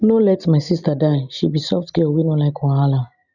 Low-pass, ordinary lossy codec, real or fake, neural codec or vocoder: 7.2 kHz; none; real; none